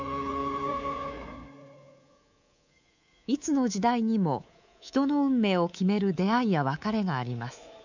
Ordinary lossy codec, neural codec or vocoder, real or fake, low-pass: none; codec, 24 kHz, 3.1 kbps, DualCodec; fake; 7.2 kHz